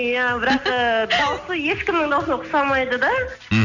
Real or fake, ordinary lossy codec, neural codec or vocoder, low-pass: real; none; none; 7.2 kHz